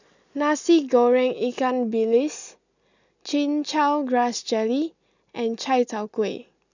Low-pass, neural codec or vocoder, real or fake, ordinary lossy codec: 7.2 kHz; none; real; none